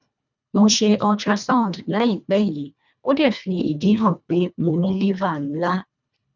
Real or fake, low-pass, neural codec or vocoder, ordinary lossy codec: fake; 7.2 kHz; codec, 24 kHz, 1.5 kbps, HILCodec; none